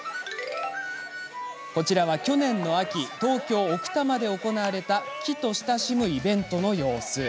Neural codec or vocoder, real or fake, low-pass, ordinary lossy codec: none; real; none; none